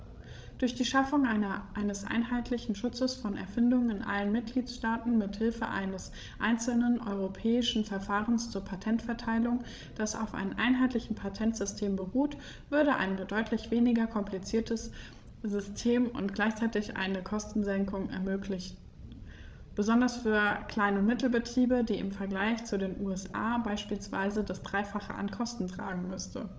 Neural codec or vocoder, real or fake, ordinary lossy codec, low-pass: codec, 16 kHz, 16 kbps, FreqCodec, larger model; fake; none; none